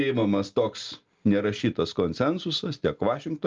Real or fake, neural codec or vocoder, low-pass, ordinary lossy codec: real; none; 7.2 kHz; Opus, 24 kbps